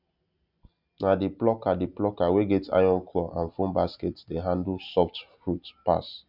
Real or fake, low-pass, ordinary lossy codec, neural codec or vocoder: real; 5.4 kHz; none; none